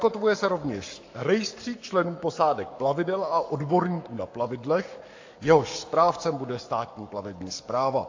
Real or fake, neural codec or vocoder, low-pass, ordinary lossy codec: fake; codec, 44.1 kHz, 7.8 kbps, Pupu-Codec; 7.2 kHz; AAC, 48 kbps